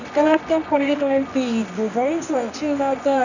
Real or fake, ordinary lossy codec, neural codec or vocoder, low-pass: fake; none; codec, 24 kHz, 0.9 kbps, WavTokenizer, medium music audio release; 7.2 kHz